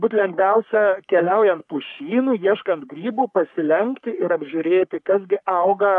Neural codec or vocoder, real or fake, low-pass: codec, 44.1 kHz, 3.4 kbps, Pupu-Codec; fake; 10.8 kHz